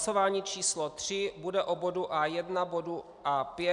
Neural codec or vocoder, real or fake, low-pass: none; real; 10.8 kHz